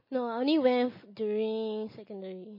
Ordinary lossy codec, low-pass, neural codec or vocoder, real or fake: MP3, 24 kbps; 5.4 kHz; none; real